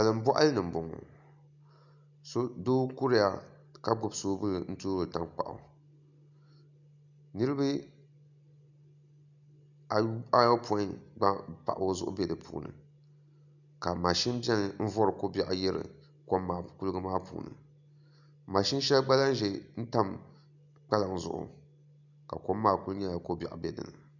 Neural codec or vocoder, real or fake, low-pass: none; real; 7.2 kHz